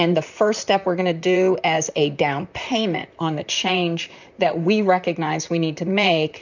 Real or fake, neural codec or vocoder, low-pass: fake; vocoder, 44.1 kHz, 128 mel bands, Pupu-Vocoder; 7.2 kHz